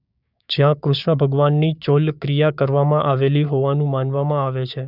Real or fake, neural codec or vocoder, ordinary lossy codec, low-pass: fake; codec, 16 kHz, 6 kbps, DAC; none; 5.4 kHz